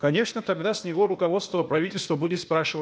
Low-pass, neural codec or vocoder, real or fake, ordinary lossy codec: none; codec, 16 kHz, 0.8 kbps, ZipCodec; fake; none